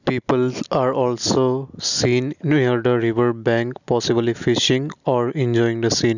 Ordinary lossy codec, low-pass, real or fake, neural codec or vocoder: none; 7.2 kHz; real; none